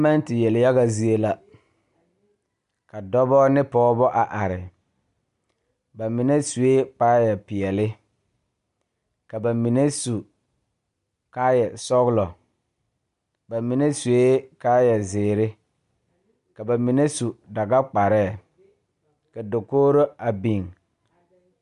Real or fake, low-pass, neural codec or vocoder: real; 10.8 kHz; none